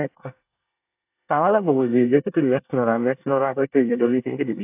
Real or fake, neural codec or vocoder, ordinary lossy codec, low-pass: fake; codec, 24 kHz, 1 kbps, SNAC; AAC, 32 kbps; 3.6 kHz